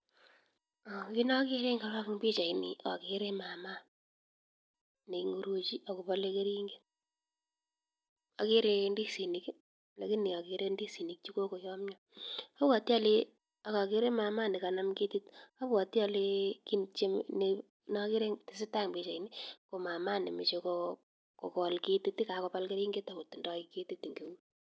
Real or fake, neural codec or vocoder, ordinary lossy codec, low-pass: real; none; none; none